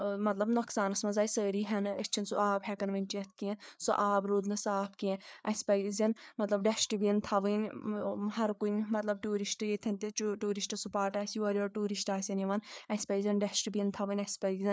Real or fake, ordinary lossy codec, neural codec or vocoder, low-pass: fake; none; codec, 16 kHz, 4 kbps, FreqCodec, larger model; none